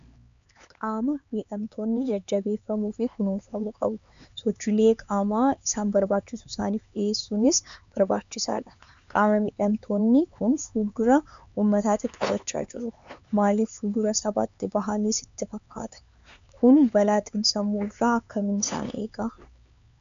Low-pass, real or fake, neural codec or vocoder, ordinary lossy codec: 7.2 kHz; fake; codec, 16 kHz, 4 kbps, X-Codec, HuBERT features, trained on LibriSpeech; MP3, 64 kbps